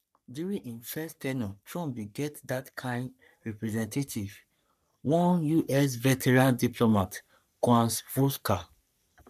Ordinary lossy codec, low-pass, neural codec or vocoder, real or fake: none; 14.4 kHz; codec, 44.1 kHz, 3.4 kbps, Pupu-Codec; fake